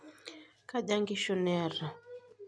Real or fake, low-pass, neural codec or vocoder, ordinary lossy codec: real; none; none; none